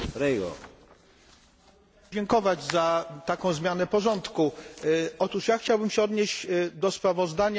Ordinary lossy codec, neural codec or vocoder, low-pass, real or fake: none; none; none; real